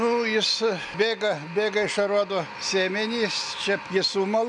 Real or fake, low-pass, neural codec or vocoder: real; 10.8 kHz; none